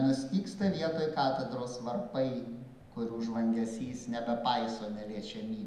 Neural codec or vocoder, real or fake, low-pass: none; real; 14.4 kHz